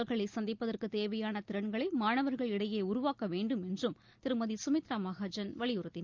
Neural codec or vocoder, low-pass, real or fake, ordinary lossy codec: none; 7.2 kHz; real; Opus, 32 kbps